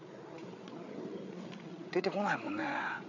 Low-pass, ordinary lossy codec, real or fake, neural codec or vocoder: 7.2 kHz; none; fake; codec, 16 kHz, 8 kbps, FreqCodec, larger model